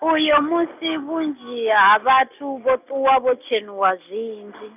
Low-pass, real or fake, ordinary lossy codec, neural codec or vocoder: 3.6 kHz; real; none; none